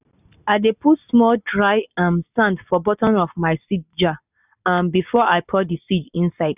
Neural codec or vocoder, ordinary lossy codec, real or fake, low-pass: none; none; real; 3.6 kHz